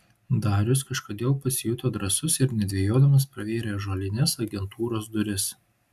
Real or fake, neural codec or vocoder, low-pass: real; none; 14.4 kHz